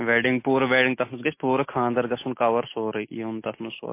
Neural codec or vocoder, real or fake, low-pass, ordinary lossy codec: none; real; 3.6 kHz; MP3, 24 kbps